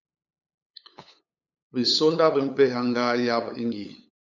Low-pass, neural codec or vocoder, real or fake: 7.2 kHz; codec, 16 kHz, 8 kbps, FunCodec, trained on LibriTTS, 25 frames a second; fake